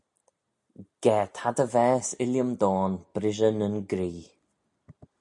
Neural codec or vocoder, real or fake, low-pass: none; real; 10.8 kHz